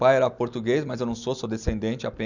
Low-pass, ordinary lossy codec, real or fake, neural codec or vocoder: 7.2 kHz; none; real; none